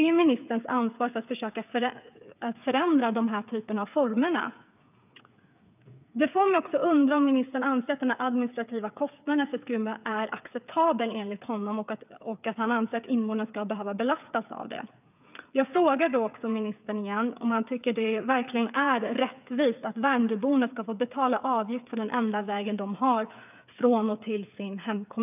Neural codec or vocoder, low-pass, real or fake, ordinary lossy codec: codec, 16 kHz, 8 kbps, FreqCodec, smaller model; 3.6 kHz; fake; none